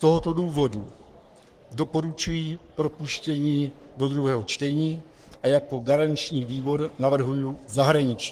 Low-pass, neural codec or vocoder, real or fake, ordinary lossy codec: 14.4 kHz; codec, 44.1 kHz, 3.4 kbps, Pupu-Codec; fake; Opus, 24 kbps